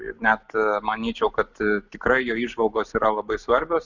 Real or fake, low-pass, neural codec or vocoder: real; 7.2 kHz; none